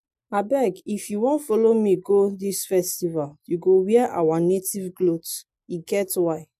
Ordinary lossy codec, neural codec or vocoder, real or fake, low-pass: MP3, 64 kbps; none; real; 14.4 kHz